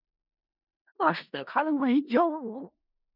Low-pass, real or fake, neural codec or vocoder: 5.4 kHz; fake; codec, 16 kHz in and 24 kHz out, 0.4 kbps, LongCat-Audio-Codec, four codebook decoder